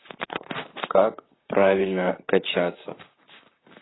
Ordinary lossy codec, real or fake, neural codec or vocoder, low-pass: AAC, 16 kbps; fake; vocoder, 44.1 kHz, 128 mel bands, Pupu-Vocoder; 7.2 kHz